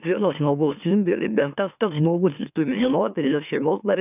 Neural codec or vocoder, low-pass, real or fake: autoencoder, 44.1 kHz, a latent of 192 numbers a frame, MeloTTS; 3.6 kHz; fake